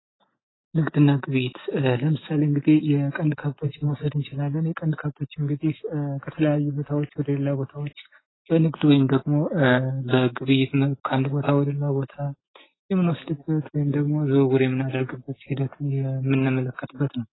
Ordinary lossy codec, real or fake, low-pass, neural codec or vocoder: AAC, 16 kbps; real; 7.2 kHz; none